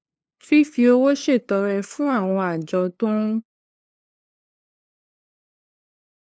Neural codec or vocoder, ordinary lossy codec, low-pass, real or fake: codec, 16 kHz, 2 kbps, FunCodec, trained on LibriTTS, 25 frames a second; none; none; fake